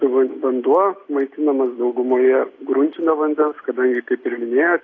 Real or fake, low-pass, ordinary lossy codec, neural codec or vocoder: real; 7.2 kHz; AAC, 32 kbps; none